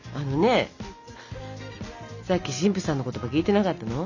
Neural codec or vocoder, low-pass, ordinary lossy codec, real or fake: none; 7.2 kHz; none; real